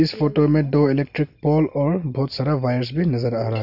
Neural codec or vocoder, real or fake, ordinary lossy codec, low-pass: none; real; none; 5.4 kHz